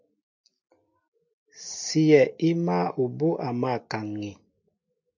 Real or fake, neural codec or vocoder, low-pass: real; none; 7.2 kHz